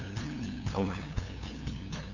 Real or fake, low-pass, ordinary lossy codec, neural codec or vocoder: fake; 7.2 kHz; none; codec, 16 kHz, 2 kbps, FunCodec, trained on LibriTTS, 25 frames a second